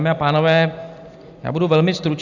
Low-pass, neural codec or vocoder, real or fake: 7.2 kHz; none; real